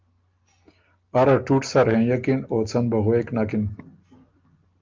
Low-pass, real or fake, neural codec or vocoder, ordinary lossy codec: 7.2 kHz; real; none; Opus, 24 kbps